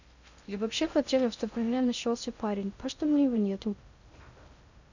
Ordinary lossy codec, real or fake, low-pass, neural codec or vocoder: none; fake; 7.2 kHz; codec, 16 kHz in and 24 kHz out, 0.6 kbps, FocalCodec, streaming, 2048 codes